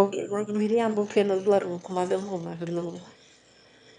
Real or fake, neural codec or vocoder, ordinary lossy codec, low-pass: fake; autoencoder, 22.05 kHz, a latent of 192 numbers a frame, VITS, trained on one speaker; none; 9.9 kHz